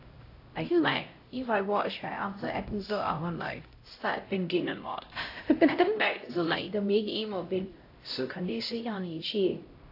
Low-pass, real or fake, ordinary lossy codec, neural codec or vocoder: 5.4 kHz; fake; AAC, 32 kbps; codec, 16 kHz, 0.5 kbps, X-Codec, HuBERT features, trained on LibriSpeech